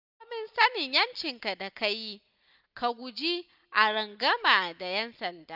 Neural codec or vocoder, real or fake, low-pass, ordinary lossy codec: none; real; 5.4 kHz; none